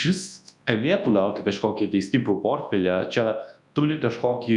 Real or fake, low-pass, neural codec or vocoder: fake; 10.8 kHz; codec, 24 kHz, 0.9 kbps, WavTokenizer, large speech release